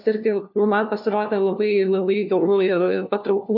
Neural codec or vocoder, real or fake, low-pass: codec, 16 kHz, 1 kbps, FunCodec, trained on LibriTTS, 50 frames a second; fake; 5.4 kHz